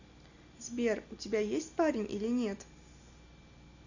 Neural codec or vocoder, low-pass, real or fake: none; 7.2 kHz; real